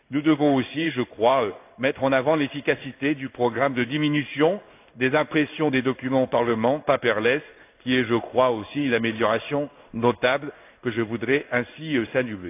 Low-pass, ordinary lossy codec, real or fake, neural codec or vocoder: 3.6 kHz; AAC, 32 kbps; fake; codec, 16 kHz in and 24 kHz out, 1 kbps, XY-Tokenizer